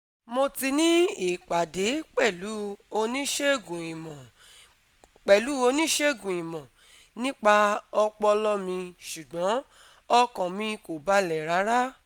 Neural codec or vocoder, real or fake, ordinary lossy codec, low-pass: none; real; none; none